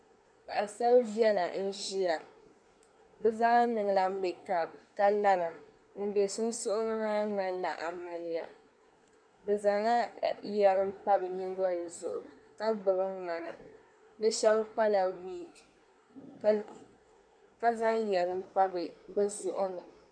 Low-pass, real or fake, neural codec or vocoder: 9.9 kHz; fake; codec, 24 kHz, 1 kbps, SNAC